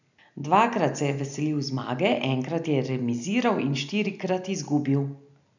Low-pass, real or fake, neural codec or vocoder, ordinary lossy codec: 7.2 kHz; real; none; none